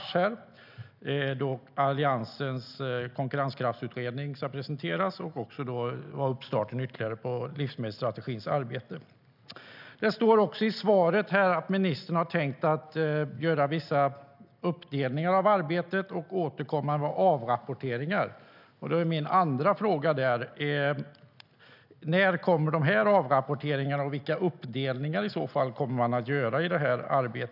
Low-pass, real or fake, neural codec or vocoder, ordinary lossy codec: 5.4 kHz; real; none; none